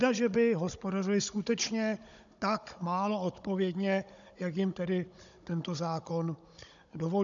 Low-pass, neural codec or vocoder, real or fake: 7.2 kHz; codec, 16 kHz, 16 kbps, FunCodec, trained on Chinese and English, 50 frames a second; fake